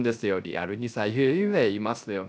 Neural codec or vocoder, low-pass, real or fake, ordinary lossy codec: codec, 16 kHz, 0.3 kbps, FocalCodec; none; fake; none